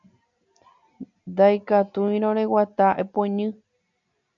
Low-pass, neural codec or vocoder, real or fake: 7.2 kHz; none; real